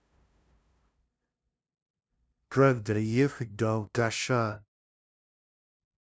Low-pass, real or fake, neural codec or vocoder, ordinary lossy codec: none; fake; codec, 16 kHz, 0.5 kbps, FunCodec, trained on LibriTTS, 25 frames a second; none